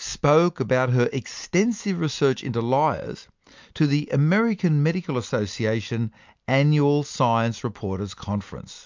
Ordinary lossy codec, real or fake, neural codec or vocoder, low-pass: MP3, 64 kbps; real; none; 7.2 kHz